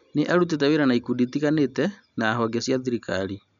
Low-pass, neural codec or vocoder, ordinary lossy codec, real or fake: 7.2 kHz; none; none; real